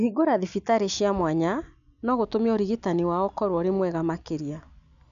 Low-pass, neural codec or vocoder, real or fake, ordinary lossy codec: 7.2 kHz; none; real; none